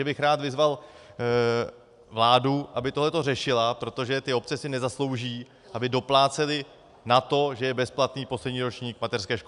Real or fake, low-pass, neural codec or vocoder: real; 10.8 kHz; none